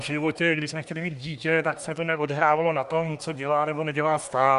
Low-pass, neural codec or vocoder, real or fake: 10.8 kHz; codec, 24 kHz, 1 kbps, SNAC; fake